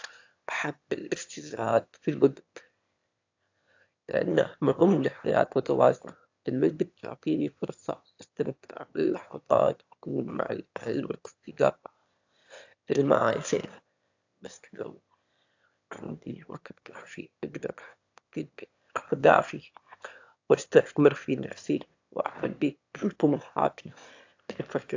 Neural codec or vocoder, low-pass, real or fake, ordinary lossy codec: autoencoder, 22.05 kHz, a latent of 192 numbers a frame, VITS, trained on one speaker; 7.2 kHz; fake; AAC, 48 kbps